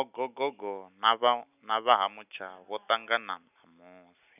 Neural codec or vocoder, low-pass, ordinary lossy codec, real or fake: none; 3.6 kHz; none; real